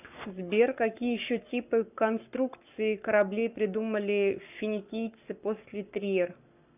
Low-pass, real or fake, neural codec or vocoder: 3.6 kHz; fake; codec, 44.1 kHz, 7.8 kbps, Pupu-Codec